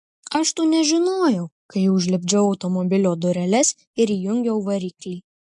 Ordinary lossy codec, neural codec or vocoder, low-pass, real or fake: MP3, 64 kbps; none; 10.8 kHz; real